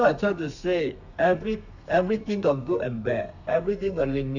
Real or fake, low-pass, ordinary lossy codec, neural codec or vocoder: fake; 7.2 kHz; none; codec, 32 kHz, 1.9 kbps, SNAC